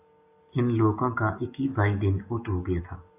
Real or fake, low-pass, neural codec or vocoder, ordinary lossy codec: real; 3.6 kHz; none; AAC, 24 kbps